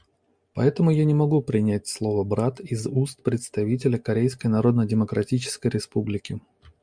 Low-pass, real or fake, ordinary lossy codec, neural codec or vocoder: 9.9 kHz; real; MP3, 64 kbps; none